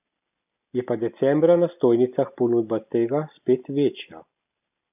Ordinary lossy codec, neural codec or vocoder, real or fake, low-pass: none; none; real; 3.6 kHz